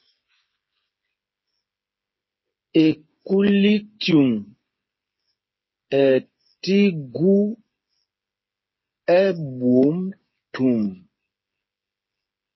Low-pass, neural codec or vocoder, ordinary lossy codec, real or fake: 7.2 kHz; codec, 16 kHz, 8 kbps, FreqCodec, smaller model; MP3, 24 kbps; fake